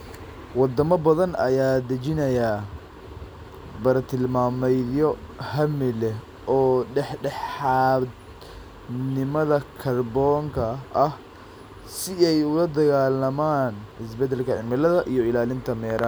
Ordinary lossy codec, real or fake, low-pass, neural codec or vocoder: none; real; none; none